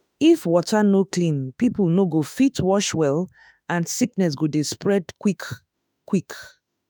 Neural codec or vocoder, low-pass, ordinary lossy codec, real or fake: autoencoder, 48 kHz, 32 numbers a frame, DAC-VAE, trained on Japanese speech; none; none; fake